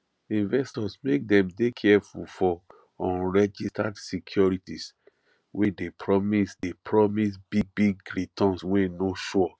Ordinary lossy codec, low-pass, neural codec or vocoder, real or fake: none; none; none; real